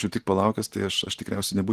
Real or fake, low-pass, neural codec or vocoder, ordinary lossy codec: real; 14.4 kHz; none; Opus, 16 kbps